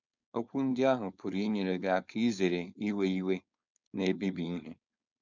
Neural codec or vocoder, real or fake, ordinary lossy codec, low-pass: codec, 16 kHz, 4.8 kbps, FACodec; fake; none; 7.2 kHz